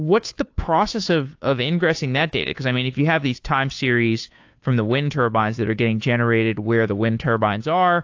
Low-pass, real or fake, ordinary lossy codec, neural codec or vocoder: 7.2 kHz; fake; AAC, 48 kbps; codec, 16 kHz, 2 kbps, FunCodec, trained on Chinese and English, 25 frames a second